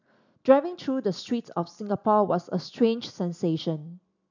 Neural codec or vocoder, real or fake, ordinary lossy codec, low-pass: none; real; none; 7.2 kHz